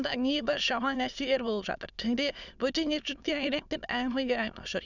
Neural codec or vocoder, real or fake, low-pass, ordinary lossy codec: autoencoder, 22.05 kHz, a latent of 192 numbers a frame, VITS, trained on many speakers; fake; 7.2 kHz; none